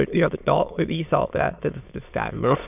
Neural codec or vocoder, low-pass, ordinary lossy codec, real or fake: autoencoder, 22.05 kHz, a latent of 192 numbers a frame, VITS, trained on many speakers; 3.6 kHz; none; fake